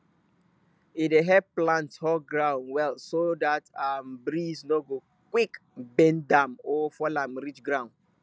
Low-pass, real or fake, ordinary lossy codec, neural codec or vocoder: none; real; none; none